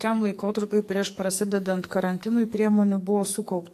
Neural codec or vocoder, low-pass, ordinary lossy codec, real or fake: codec, 44.1 kHz, 2.6 kbps, SNAC; 14.4 kHz; AAC, 64 kbps; fake